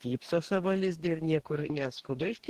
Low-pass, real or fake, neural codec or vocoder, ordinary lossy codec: 14.4 kHz; fake; codec, 44.1 kHz, 2.6 kbps, DAC; Opus, 16 kbps